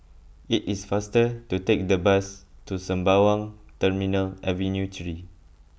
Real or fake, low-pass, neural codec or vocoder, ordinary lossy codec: real; none; none; none